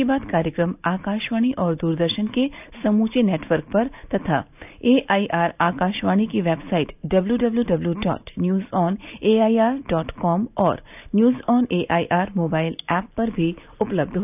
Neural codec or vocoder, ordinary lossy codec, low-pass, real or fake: none; none; 3.6 kHz; real